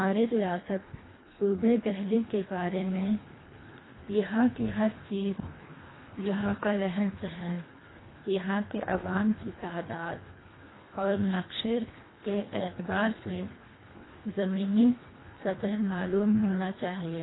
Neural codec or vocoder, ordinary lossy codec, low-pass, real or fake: codec, 24 kHz, 1.5 kbps, HILCodec; AAC, 16 kbps; 7.2 kHz; fake